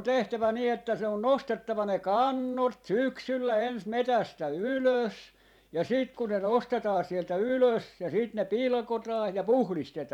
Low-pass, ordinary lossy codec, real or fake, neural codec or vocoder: 19.8 kHz; none; fake; vocoder, 44.1 kHz, 128 mel bands every 512 samples, BigVGAN v2